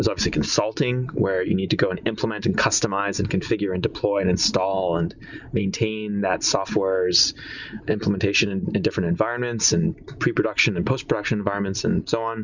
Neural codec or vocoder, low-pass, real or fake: none; 7.2 kHz; real